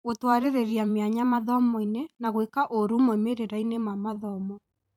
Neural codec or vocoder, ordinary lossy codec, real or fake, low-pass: vocoder, 44.1 kHz, 128 mel bands every 512 samples, BigVGAN v2; none; fake; 19.8 kHz